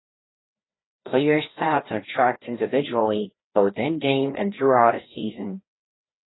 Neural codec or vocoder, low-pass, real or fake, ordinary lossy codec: codec, 16 kHz, 1 kbps, FreqCodec, larger model; 7.2 kHz; fake; AAC, 16 kbps